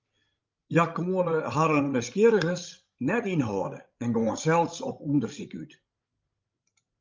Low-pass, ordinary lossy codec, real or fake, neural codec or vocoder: 7.2 kHz; Opus, 24 kbps; fake; codec, 16 kHz, 16 kbps, FreqCodec, larger model